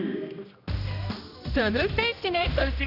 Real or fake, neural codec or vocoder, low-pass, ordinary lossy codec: fake; codec, 16 kHz, 1 kbps, X-Codec, HuBERT features, trained on general audio; 5.4 kHz; none